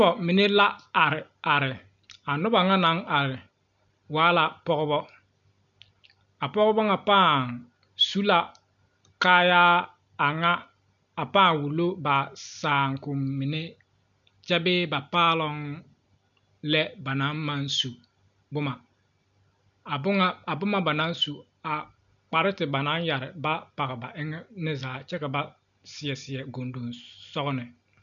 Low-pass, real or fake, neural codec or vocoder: 7.2 kHz; real; none